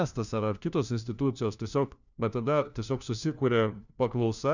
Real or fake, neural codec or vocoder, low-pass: fake; codec, 16 kHz, 1 kbps, FunCodec, trained on LibriTTS, 50 frames a second; 7.2 kHz